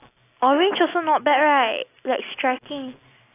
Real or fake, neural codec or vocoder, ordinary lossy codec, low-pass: real; none; AAC, 24 kbps; 3.6 kHz